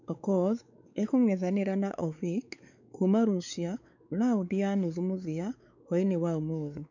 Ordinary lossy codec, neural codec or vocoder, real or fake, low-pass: none; codec, 16 kHz, 4 kbps, X-Codec, WavLM features, trained on Multilingual LibriSpeech; fake; 7.2 kHz